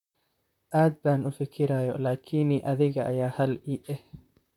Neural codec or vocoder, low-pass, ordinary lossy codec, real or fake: vocoder, 44.1 kHz, 128 mel bands, Pupu-Vocoder; 19.8 kHz; none; fake